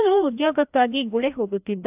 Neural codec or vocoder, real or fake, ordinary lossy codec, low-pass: codec, 16 kHz, 1 kbps, FreqCodec, larger model; fake; AAC, 32 kbps; 3.6 kHz